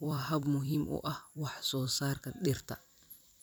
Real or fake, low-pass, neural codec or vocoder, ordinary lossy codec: fake; none; vocoder, 44.1 kHz, 128 mel bands every 256 samples, BigVGAN v2; none